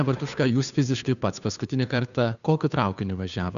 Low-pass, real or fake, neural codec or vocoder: 7.2 kHz; fake; codec, 16 kHz, 2 kbps, FunCodec, trained on Chinese and English, 25 frames a second